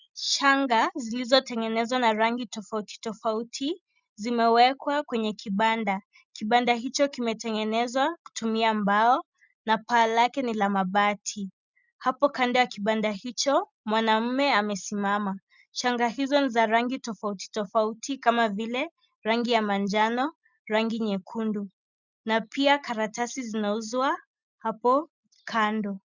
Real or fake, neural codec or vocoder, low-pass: real; none; 7.2 kHz